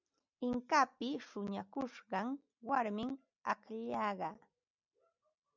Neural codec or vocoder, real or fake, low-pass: none; real; 7.2 kHz